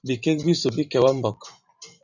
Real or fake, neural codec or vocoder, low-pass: fake; vocoder, 44.1 kHz, 128 mel bands every 512 samples, BigVGAN v2; 7.2 kHz